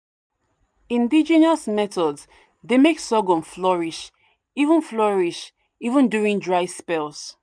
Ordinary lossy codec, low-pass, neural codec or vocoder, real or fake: none; 9.9 kHz; none; real